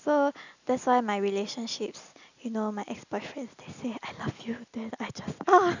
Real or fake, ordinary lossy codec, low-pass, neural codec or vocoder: real; none; 7.2 kHz; none